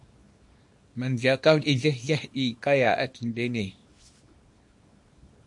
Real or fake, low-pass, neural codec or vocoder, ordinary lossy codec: fake; 10.8 kHz; codec, 24 kHz, 0.9 kbps, WavTokenizer, small release; MP3, 48 kbps